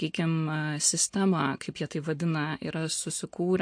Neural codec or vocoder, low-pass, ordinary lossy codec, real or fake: none; 9.9 kHz; MP3, 48 kbps; real